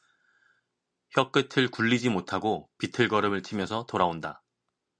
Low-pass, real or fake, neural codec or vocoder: 9.9 kHz; real; none